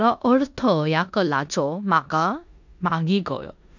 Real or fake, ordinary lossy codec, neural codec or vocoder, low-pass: fake; none; codec, 16 kHz in and 24 kHz out, 0.9 kbps, LongCat-Audio-Codec, four codebook decoder; 7.2 kHz